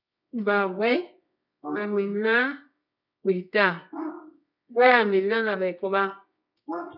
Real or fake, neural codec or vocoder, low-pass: fake; codec, 24 kHz, 0.9 kbps, WavTokenizer, medium music audio release; 5.4 kHz